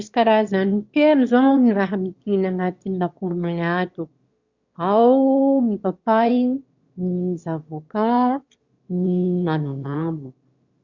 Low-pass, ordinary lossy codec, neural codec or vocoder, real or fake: 7.2 kHz; Opus, 64 kbps; autoencoder, 22.05 kHz, a latent of 192 numbers a frame, VITS, trained on one speaker; fake